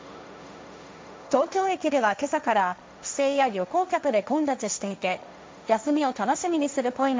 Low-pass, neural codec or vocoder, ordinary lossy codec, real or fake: none; codec, 16 kHz, 1.1 kbps, Voila-Tokenizer; none; fake